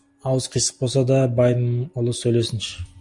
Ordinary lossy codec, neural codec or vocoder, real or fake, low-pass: Opus, 64 kbps; none; real; 10.8 kHz